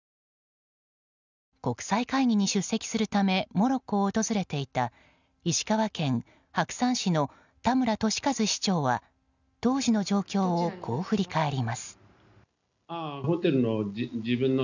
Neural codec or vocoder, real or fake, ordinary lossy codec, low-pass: none; real; none; 7.2 kHz